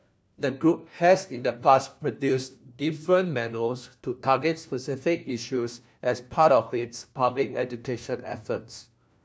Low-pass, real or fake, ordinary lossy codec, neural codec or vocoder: none; fake; none; codec, 16 kHz, 1 kbps, FunCodec, trained on LibriTTS, 50 frames a second